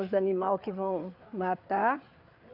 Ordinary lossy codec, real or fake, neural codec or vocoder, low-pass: none; fake; codec, 16 kHz, 4 kbps, FreqCodec, larger model; 5.4 kHz